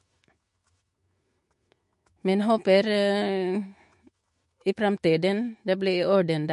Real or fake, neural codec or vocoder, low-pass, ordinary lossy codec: fake; autoencoder, 48 kHz, 128 numbers a frame, DAC-VAE, trained on Japanese speech; 14.4 kHz; MP3, 48 kbps